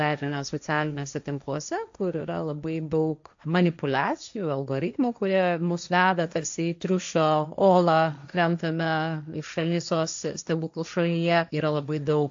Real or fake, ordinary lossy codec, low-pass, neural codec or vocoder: fake; AAC, 64 kbps; 7.2 kHz; codec, 16 kHz, 1.1 kbps, Voila-Tokenizer